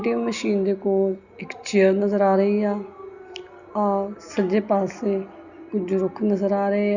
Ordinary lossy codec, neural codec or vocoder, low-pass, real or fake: Opus, 64 kbps; none; 7.2 kHz; real